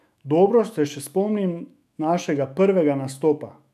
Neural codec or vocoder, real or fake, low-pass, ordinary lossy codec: autoencoder, 48 kHz, 128 numbers a frame, DAC-VAE, trained on Japanese speech; fake; 14.4 kHz; none